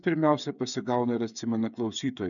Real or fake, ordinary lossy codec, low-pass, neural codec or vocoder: fake; MP3, 96 kbps; 7.2 kHz; codec, 16 kHz, 8 kbps, FreqCodec, smaller model